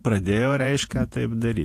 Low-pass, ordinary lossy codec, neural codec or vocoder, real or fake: 14.4 kHz; AAC, 64 kbps; vocoder, 48 kHz, 128 mel bands, Vocos; fake